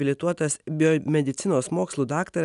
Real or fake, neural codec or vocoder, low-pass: real; none; 10.8 kHz